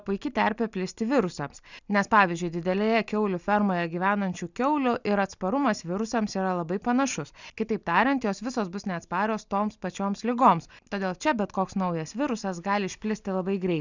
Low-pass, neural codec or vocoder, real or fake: 7.2 kHz; none; real